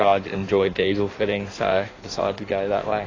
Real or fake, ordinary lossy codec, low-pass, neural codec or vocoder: fake; AAC, 32 kbps; 7.2 kHz; codec, 16 kHz in and 24 kHz out, 1.1 kbps, FireRedTTS-2 codec